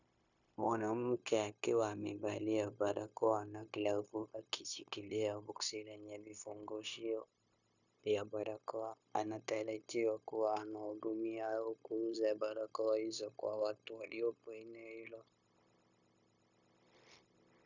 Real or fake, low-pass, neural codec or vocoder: fake; 7.2 kHz; codec, 16 kHz, 0.9 kbps, LongCat-Audio-Codec